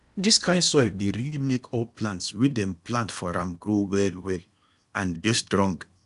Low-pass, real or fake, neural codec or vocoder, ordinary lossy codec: 10.8 kHz; fake; codec, 16 kHz in and 24 kHz out, 0.8 kbps, FocalCodec, streaming, 65536 codes; none